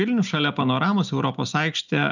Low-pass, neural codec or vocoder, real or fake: 7.2 kHz; none; real